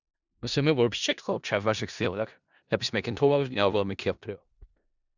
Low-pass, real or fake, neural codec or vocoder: 7.2 kHz; fake; codec, 16 kHz in and 24 kHz out, 0.4 kbps, LongCat-Audio-Codec, four codebook decoder